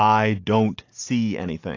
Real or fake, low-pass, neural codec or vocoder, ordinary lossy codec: real; 7.2 kHz; none; AAC, 48 kbps